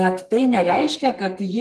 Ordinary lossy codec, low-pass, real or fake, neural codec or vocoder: Opus, 32 kbps; 14.4 kHz; fake; codec, 32 kHz, 1.9 kbps, SNAC